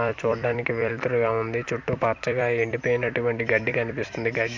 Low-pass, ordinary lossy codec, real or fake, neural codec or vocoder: 7.2 kHz; MP3, 64 kbps; fake; vocoder, 44.1 kHz, 128 mel bands every 256 samples, BigVGAN v2